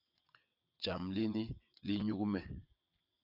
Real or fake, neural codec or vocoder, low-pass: fake; vocoder, 22.05 kHz, 80 mel bands, WaveNeXt; 5.4 kHz